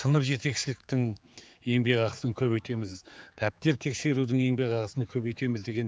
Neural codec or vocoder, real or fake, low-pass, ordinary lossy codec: codec, 16 kHz, 4 kbps, X-Codec, HuBERT features, trained on general audio; fake; none; none